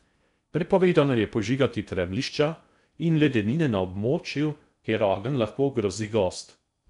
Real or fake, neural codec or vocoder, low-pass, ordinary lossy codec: fake; codec, 16 kHz in and 24 kHz out, 0.6 kbps, FocalCodec, streaming, 4096 codes; 10.8 kHz; none